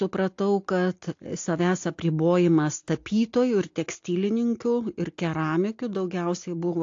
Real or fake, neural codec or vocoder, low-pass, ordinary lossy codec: real; none; 7.2 kHz; AAC, 48 kbps